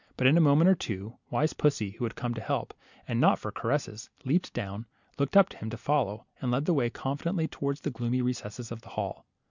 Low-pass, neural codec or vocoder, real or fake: 7.2 kHz; none; real